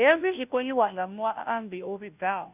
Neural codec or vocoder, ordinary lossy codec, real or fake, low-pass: codec, 16 kHz, 0.5 kbps, FunCodec, trained on Chinese and English, 25 frames a second; none; fake; 3.6 kHz